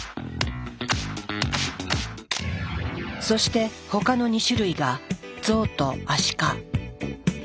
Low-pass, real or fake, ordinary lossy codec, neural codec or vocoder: none; real; none; none